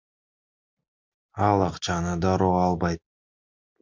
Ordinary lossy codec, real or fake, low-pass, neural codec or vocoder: MP3, 64 kbps; real; 7.2 kHz; none